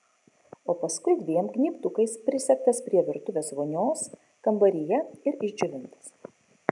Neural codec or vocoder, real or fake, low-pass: none; real; 10.8 kHz